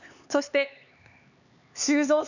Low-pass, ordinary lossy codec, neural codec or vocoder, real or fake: 7.2 kHz; none; codec, 16 kHz, 4 kbps, X-Codec, HuBERT features, trained on LibriSpeech; fake